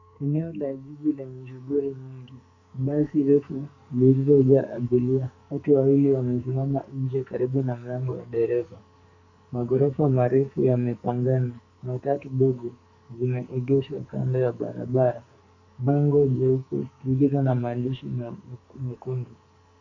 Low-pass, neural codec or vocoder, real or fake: 7.2 kHz; codec, 44.1 kHz, 2.6 kbps, SNAC; fake